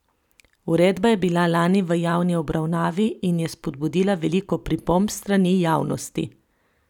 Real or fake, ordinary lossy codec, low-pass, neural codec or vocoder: fake; none; 19.8 kHz; vocoder, 44.1 kHz, 128 mel bands every 512 samples, BigVGAN v2